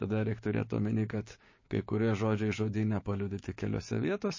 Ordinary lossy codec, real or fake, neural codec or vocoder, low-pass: MP3, 32 kbps; fake; codec, 16 kHz, 6 kbps, DAC; 7.2 kHz